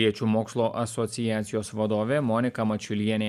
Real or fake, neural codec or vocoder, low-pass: real; none; 14.4 kHz